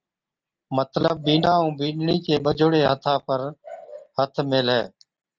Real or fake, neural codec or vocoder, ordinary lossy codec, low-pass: real; none; Opus, 24 kbps; 7.2 kHz